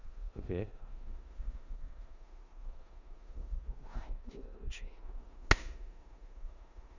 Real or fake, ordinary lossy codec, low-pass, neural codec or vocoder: fake; none; 7.2 kHz; codec, 16 kHz in and 24 kHz out, 0.9 kbps, LongCat-Audio-Codec, four codebook decoder